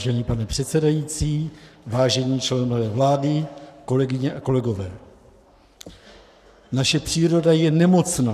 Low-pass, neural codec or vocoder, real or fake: 14.4 kHz; codec, 44.1 kHz, 7.8 kbps, Pupu-Codec; fake